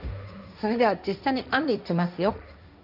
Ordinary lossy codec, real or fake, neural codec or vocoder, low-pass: none; fake; codec, 16 kHz, 1.1 kbps, Voila-Tokenizer; 5.4 kHz